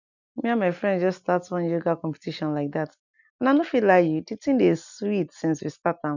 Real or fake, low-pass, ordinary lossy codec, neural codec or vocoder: real; 7.2 kHz; none; none